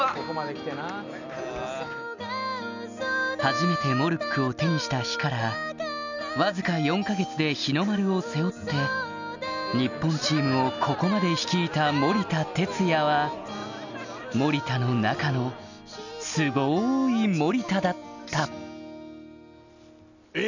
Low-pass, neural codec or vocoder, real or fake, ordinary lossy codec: 7.2 kHz; none; real; none